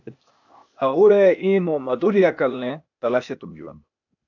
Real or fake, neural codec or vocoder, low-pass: fake; codec, 16 kHz, 0.8 kbps, ZipCodec; 7.2 kHz